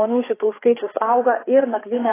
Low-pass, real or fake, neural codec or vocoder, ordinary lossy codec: 3.6 kHz; fake; codec, 16 kHz, 4 kbps, FreqCodec, larger model; AAC, 16 kbps